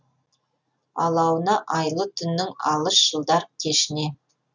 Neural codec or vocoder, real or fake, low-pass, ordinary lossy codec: none; real; 7.2 kHz; none